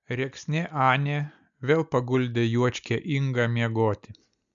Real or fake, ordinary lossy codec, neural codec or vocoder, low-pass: real; MP3, 96 kbps; none; 7.2 kHz